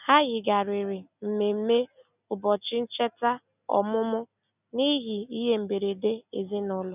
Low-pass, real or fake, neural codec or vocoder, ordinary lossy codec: 3.6 kHz; real; none; none